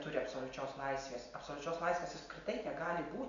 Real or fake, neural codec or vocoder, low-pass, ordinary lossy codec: real; none; 7.2 kHz; MP3, 64 kbps